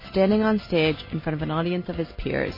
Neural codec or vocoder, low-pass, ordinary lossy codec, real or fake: none; 5.4 kHz; MP3, 24 kbps; real